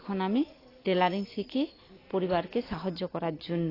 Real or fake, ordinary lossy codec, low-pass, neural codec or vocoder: real; AAC, 24 kbps; 5.4 kHz; none